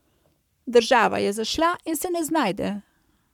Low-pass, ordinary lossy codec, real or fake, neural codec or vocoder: 19.8 kHz; none; fake; codec, 44.1 kHz, 7.8 kbps, Pupu-Codec